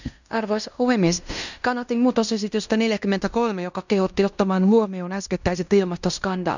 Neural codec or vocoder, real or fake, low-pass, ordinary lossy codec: codec, 16 kHz in and 24 kHz out, 0.9 kbps, LongCat-Audio-Codec, fine tuned four codebook decoder; fake; 7.2 kHz; none